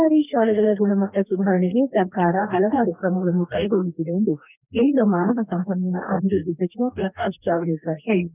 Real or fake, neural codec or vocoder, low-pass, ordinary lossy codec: fake; codec, 44.1 kHz, 2.6 kbps, DAC; 3.6 kHz; none